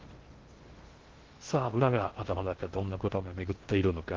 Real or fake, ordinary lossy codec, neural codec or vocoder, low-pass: fake; Opus, 16 kbps; codec, 16 kHz in and 24 kHz out, 0.6 kbps, FocalCodec, streaming, 2048 codes; 7.2 kHz